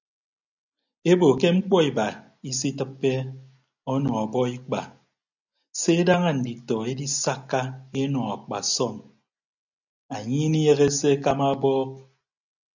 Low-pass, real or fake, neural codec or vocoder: 7.2 kHz; real; none